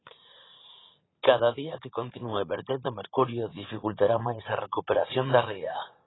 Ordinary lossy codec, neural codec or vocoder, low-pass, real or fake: AAC, 16 kbps; vocoder, 44.1 kHz, 80 mel bands, Vocos; 7.2 kHz; fake